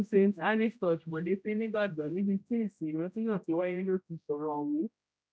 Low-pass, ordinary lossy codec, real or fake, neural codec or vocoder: none; none; fake; codec, 16 kHz, 0.5 kbps, X-Codec, HuBERT features, trained on general audio